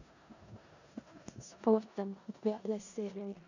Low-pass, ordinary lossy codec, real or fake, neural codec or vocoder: 7.2 kHz; none; fake; codec, 16 kHz in and 24 kHz out, 0.4 kbps, LongCat-Audio-Codec, four codebook decoder